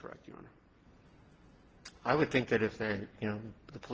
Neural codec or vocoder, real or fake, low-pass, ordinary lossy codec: none; real; 7.2 kHz; Opus, 16 kbps